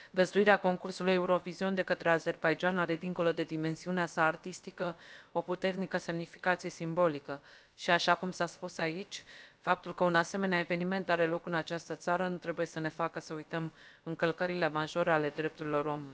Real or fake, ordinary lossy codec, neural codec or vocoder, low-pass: fake; none; codec, 16 kHz, about 1 kbps, DyCAST, with the encoder's durations; none